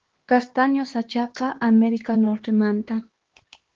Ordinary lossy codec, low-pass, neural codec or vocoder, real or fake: Opus, 16 kbps; 7.2 kHz; codec, 16 kHz, 2 kbps, X-Codec, HuBERT features, trained on LibriSpeech; fake